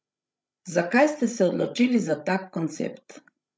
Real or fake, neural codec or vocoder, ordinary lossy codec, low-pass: fake; codec, 16 kHz, 16 kbps, FreqCodec, larger model; none; none